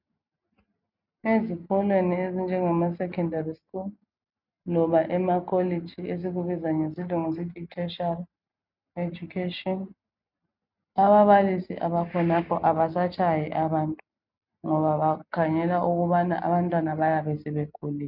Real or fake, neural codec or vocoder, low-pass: real; none; 5.4 kHz